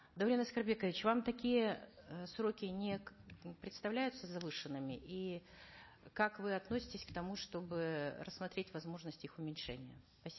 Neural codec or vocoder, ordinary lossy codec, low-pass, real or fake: none; MP3, 24 kbps; 7.2 kHz; real